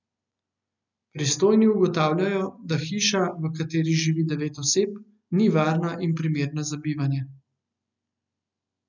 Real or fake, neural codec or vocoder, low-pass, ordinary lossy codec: real; none; 7.2 kHz; none